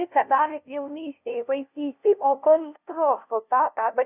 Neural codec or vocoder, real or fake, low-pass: codec, 16 kHz, 0.5 kbps, FunCodec, trained on LibriTTS, 25 frames a second; fake; 3.6 kHz